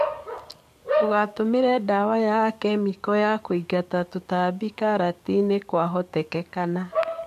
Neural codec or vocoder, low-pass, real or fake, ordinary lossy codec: codec, 44.1 kHz, 7.8 kbps, DAC; 14.4 kHz; fake; MP3, 64 kbps